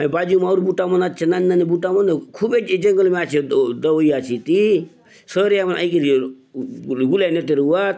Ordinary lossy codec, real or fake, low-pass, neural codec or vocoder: none; real; none; none